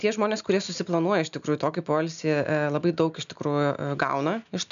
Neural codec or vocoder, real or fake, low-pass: none; real; 7.2 kHz